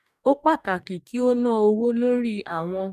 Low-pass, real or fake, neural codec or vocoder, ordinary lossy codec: 14.4 kHz; fake; codec, 44.1 kHz, 2.6 kbps, DAC; none